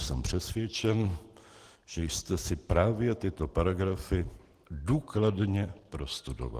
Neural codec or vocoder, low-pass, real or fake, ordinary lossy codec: none; 14.4 kHz; real; Opus, 16 kbps